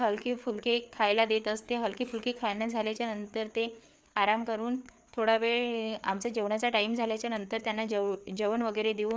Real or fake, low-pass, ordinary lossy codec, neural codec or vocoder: fake; none; none; codec, 16 kHz, 4 kbps, FreqCodec, larger model